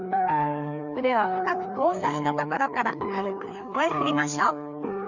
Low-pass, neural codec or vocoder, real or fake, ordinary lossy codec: 7.2 kHz; codec, 16 kHz, 2 kbps, FreqCodec, larger model; fake; none